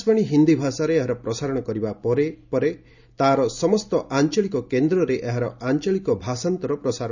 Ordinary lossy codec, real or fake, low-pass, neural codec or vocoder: none; real; 7.2 kHz; none